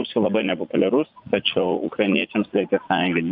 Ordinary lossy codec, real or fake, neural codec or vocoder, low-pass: AAC, 48 kbps; fake; vocoder, 44.1 kHz, 80 mel bands, Vocos; 5.4 kHz